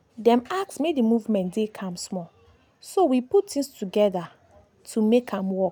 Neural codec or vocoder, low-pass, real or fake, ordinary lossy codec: none; none; real; none